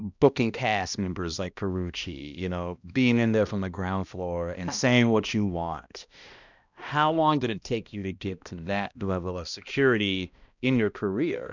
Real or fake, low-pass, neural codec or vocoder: fake; 7.2 kHz; codec, 16 kHz, 1 kbps, X-Codec, HuBERT features, trained on balanced general audio